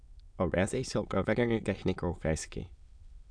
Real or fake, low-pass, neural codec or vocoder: fake; 9.9 kHz; autoencoder, 22.05 kHz, a latent of 192 numbers a frame, VITS, trained on many speakers